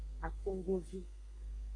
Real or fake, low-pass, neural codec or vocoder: fake; 9.9 kHz; codec, 32 kHz, 1.9 kbps, SNAC